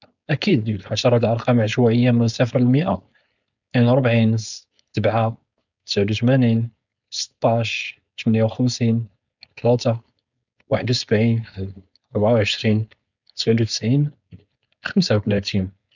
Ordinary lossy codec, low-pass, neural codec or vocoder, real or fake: none; 7.2 kHz; codec, 16 kHz, 4.8 kbps, FACodec; fake